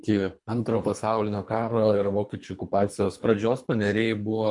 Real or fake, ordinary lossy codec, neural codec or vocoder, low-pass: fake; MP3, 64 kbps; codec, 24 kHz, 3 kbps, HILCodec; 10.8 kHz